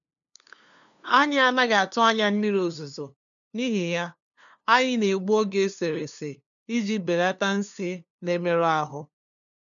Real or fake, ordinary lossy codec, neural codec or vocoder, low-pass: fake; none; codec, 16 kHz, 2 kbps, FunCodec, trained on LibriTTS, 25 frames a second; 7.2 kHz